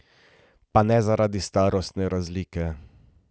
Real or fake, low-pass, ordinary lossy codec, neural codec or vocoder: real; none; none; none